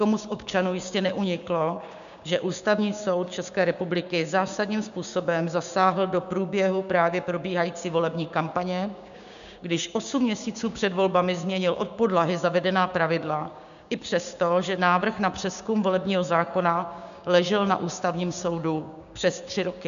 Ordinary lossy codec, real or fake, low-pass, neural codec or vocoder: MP3, 96 kbps; fake; 7.2 kHz; codec, 16 kHz, 6 kbps, DAC